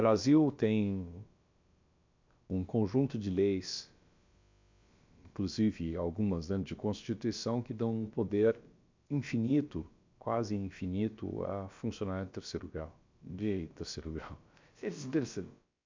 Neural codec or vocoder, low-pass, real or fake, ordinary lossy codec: codec, 16 kHz, about 1 kbps, DyCAST, with the encoder's durations; 7.2 kHz; fake; MP3, 64 kbps